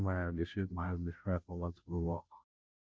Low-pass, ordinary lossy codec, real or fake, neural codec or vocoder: none; none; fake; codec, 16 kHz, 0.5 kbps, FunCodec, trained on Chinese and English, 25 frames a second